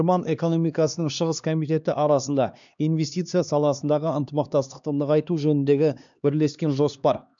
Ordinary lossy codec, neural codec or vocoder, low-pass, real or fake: none; codec, 16 kHz, 2 kbps, X-Codec, HuBERT features, trained on LibriSpeech; 7.2 kHz; fake